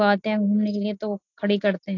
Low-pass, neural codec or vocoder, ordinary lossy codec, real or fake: 7.2 kHz; none; AAC, 48 kbps; real